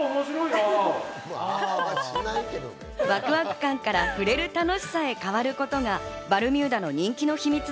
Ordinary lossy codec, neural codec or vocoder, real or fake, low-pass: none; none; real; none